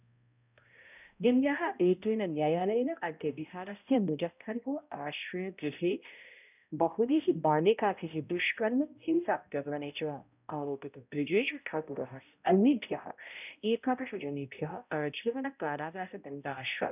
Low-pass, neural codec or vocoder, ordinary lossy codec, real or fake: 3.6 kHz; codec, 16 kHz, 0.5 kbps, X-Codec, HuBERT features, trained on balanced general audio; none; fake